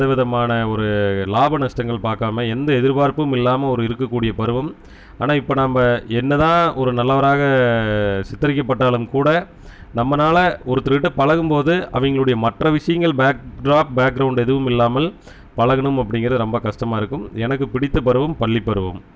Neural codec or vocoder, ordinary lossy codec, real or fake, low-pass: none; none; real; none